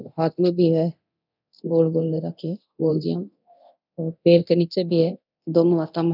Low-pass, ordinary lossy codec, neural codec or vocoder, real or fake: 5.4 kHz; none; codec, 24 kHz, 0.9 kbps, DualCodec; fake